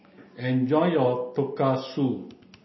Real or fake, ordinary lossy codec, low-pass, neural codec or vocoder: real; MP3, 24 kbps; 7.2 kHz; none